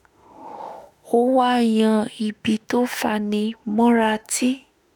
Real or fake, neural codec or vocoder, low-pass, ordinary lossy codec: fake; autoencoder, 48 kHz, 32 numbers a frame, DAC-VAE, trained on Japanese speech; none; none